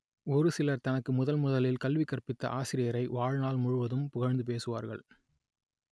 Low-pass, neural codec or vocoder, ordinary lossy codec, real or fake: none; none; none; real